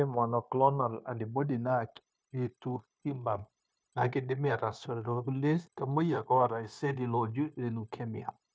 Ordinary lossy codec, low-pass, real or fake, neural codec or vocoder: none; none; fake; codec, 16 kHz, 0.9 kbps, LongCat-Audio-Codec